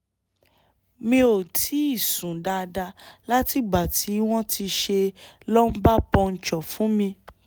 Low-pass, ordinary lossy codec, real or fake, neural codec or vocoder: none; none; real; none